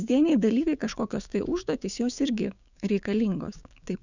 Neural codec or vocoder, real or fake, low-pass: codec, 16 kHz, 6 kbps, DAC; fake; 7.2 kHz